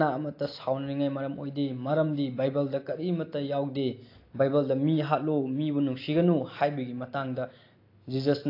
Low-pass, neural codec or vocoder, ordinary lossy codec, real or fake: 5.4 kHz; none; AAC, 32 kbps; real